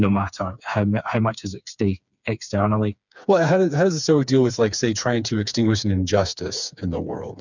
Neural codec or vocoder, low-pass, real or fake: codec, 16 kHz, 4 kbps, FreqCodec, smaller model; 7.2 kHz; fake